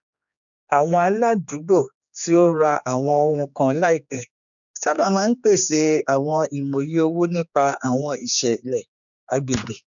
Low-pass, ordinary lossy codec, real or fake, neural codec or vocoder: 7.2 kHz; none; fake; codec, 16 kHz, 2 kbps, X-Codec, HuBERT features, trained on general audio